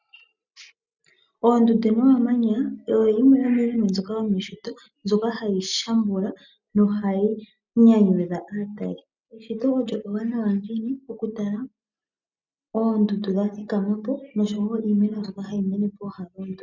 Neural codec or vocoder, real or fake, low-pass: none; real; 7.2 kHz